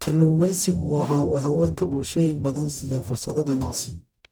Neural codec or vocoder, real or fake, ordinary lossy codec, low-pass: codec, 44.1 kHz, 0.9 kbps, DAC; fake; none; none